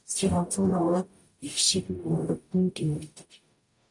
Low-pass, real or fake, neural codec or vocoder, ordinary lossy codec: 10.8 kHz; fake; codec, 44.1 kHz, 0.9 kbps, DAC; MP3, 64 kbps